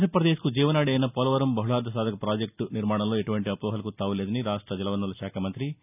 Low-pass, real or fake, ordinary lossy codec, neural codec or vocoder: 3.6 kHz; real; none; none